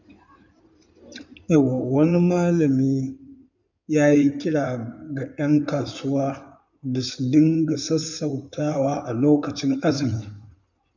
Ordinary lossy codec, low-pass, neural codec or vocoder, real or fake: none; 7.2 kHz; codec, 16 kHz in and 24 kHz out, 2.2 kbps, FireRedTTS-2 codec; fake